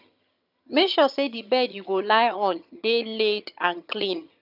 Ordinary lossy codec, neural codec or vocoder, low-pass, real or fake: none; vocoder, 22.05 kHz, 80 mel bands, HiFi-GAN; 5.4 kHz; fake